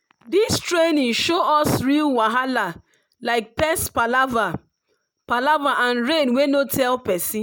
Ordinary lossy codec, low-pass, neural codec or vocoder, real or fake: none; none; none; real